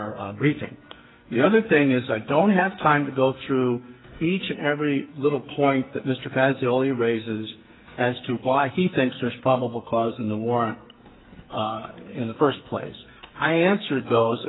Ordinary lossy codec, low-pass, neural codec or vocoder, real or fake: AAC, 16 kbps; 7.2 kHz; codec, 44.1 kHz, 2.6 kbps, SNAC; fake